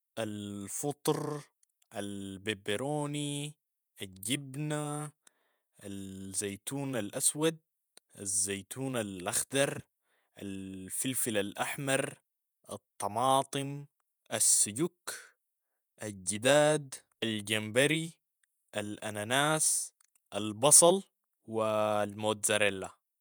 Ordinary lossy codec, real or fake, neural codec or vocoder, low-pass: none; fake; vocoder, 48 kHz, 128 mel bands, Vocos; none